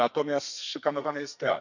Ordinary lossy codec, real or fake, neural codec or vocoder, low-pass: none; fake; codec, 32 kHz, 1.9 kbps, SNAC; 7.2 kHz